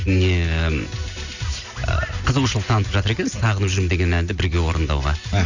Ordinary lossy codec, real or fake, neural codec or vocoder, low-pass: Opus, 64 kbps; real; none; 7.2 kHz